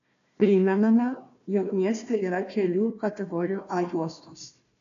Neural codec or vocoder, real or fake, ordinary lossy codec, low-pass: codec, 16 kHz, 1 kbps, FunCodec, trained on Chinese and English, 50 frames a second; fake; AAC, 96 kbps; 7.2 kHz